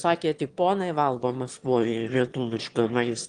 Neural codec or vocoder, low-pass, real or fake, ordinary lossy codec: autoencoder, 22.05 kHz, a latent of 192 numbers a frame, VITS, trained on one speaker; 9.9 kHz; fake; Opus, 24 kbps